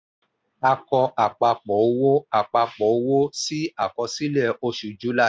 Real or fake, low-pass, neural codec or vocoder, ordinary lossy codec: real; none; none; none